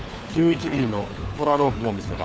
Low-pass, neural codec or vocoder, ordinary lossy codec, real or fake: none; codec, 16 kHz, 4 kbps, FunCodec, trained on LibriTTS, 50 frames a second; none; fake